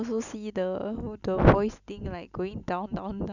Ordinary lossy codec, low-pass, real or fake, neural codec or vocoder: none; 7.2 kHz; real; none